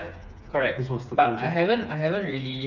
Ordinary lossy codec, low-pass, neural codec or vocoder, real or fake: none; 7.2 kHz; codec, 16 kHz, 4 kbps, FreqCodec, smaller model; fake